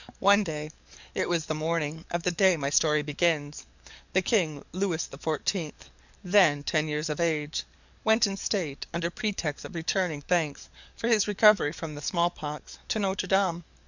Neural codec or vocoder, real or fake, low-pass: codec, 44.1 kHz, 7.8 kbps, DAC; fake; 7.2 kHz